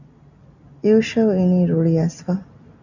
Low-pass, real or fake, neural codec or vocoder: 7.2 kHz; real; none